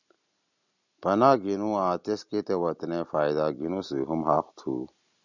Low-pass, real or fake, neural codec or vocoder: 7.2 kHz; real; none